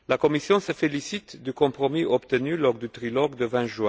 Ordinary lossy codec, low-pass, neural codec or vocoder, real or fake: none; none; none; real